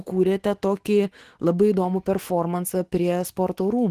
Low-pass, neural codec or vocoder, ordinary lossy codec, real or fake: 14.4 kHz; autoencoder, 48 kHz, 128 numbers a frame, DAC-VAE, trained on Japanese speech; Opus, 16 kbps; fake